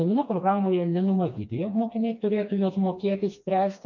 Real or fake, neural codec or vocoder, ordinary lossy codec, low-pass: fake; codec, 16 kHz, 2 kbps, FreqCodec, smaller model; MP3, 48 kbps; 7.2 kHz